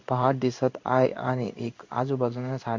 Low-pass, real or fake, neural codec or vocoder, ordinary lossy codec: 7.2 kHz; fake; codec, 16 kHz in and 24 kHz out, 1 kbps, XY-Tokenizer; MP3, 48 kbps